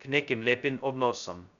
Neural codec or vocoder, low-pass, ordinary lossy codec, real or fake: codec, 16 kHz, 0.2 kbps, FocalCodec; 7.2 kHz; none; fake